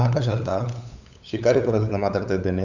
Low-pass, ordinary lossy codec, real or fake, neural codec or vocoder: 7.2 kHz; none; fake; codec, 16 kHz, 8 kbps, FunCodec, trained on LibriTTS, 25 frames a second